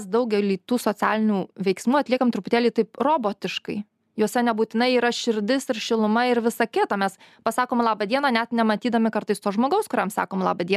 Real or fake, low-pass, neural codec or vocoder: real; 14.4 kHz; none